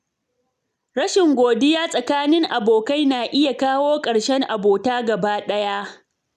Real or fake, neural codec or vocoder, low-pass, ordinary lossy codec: real; none; 14.4 kHz; none